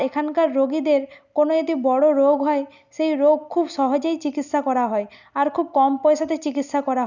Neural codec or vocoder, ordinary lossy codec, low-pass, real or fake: none; none; 7.2 kHz; real